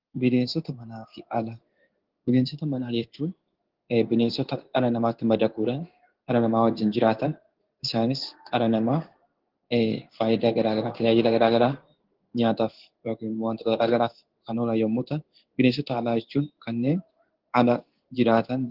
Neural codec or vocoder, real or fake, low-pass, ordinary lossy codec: codec, 16 kHz in and 24 kHz out, 1 kbps, XY-Tokenizer; fake; 5.4 kHz; Opus, 16 kbps